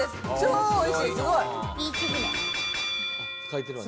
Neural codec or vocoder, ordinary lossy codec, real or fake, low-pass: none; none; real; none